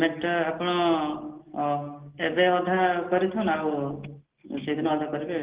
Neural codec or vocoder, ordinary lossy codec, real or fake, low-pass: none; Opus, 16 kbps; real; 3.6 kHz